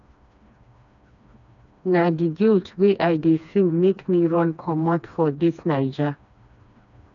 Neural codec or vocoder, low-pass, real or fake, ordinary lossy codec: codec, 16 kHz, 2 kbps, FreqCodec, smaller model; 7.2 kHz; fake; none